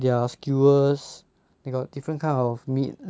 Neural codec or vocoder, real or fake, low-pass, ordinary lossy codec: none; real; none; none